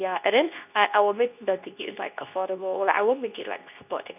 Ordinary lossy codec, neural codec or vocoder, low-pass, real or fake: none; codec, 24 kHz, 0.9 kbps, WavTokenizer, medium speech release version 2; 3.6 kHz; fake